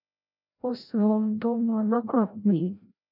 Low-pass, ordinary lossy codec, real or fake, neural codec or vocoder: 5.4 kHz; none; fake; codec, 16 kHz, 0.5 kbps, FreqCodec, larger model